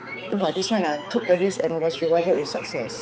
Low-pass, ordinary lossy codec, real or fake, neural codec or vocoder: none; none; fake; codec, 16 kHz, 4 kbps, X-Codec, HuBERT features, trained on balanced general audio